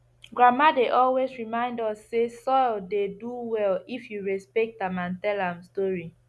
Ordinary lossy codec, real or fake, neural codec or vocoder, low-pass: none; real; none; none